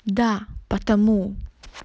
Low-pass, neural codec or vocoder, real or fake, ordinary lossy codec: none; none; real; none